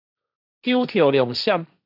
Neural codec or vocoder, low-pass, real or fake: codec, 16 kHz, 1.1 kbps, Voila-Tokenizer; 5.4 kHz; fake